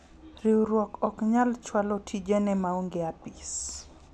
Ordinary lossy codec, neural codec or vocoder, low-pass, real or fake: none; none; none; real